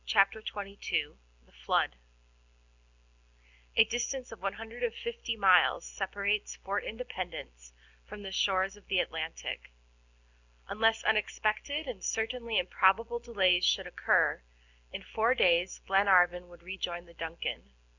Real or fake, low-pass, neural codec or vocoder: real; 7.2 kHz; none